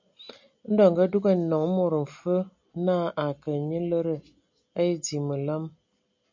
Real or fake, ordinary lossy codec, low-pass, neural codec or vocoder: real; MP3, 64 kbps; 7.2 kHz; none